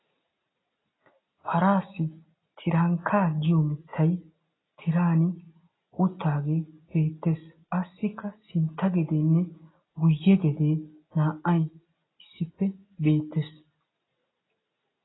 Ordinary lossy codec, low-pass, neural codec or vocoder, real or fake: AAC, 16 kbps; 7.2 kHz; none; real